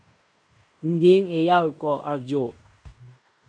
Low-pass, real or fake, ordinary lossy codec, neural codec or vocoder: 9.9 kHz; fake; MP3, 64 kbps; codec, 16 kHz in and 24 kHz out, 0.9 kbps, LongCat-Audio-Codec, fine tuned four codebook decoder